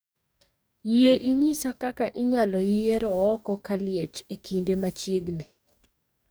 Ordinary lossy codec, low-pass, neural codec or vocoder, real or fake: none; none; codec, 44.1 kHz, 2.6 kbps, DAC; fake